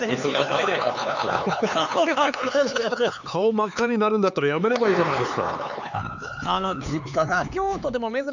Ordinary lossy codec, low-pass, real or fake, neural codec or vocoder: none; 7.2 kHz; fake; codec, 16 kHz, 4 kbps, X-Codec, HuBERT features, trained on LibriSpeech